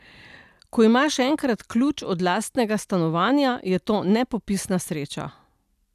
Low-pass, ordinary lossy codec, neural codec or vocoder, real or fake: 14.4 kHz; none; none; real